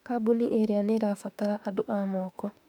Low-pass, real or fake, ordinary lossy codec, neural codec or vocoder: 19.8 kHz; fake; none; autoencoder, 48 kHz, 32 numbers a frame, DAC-VAE, trained on Japanese speech